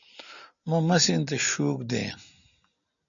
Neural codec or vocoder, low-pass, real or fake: none; 7.2 kHz; real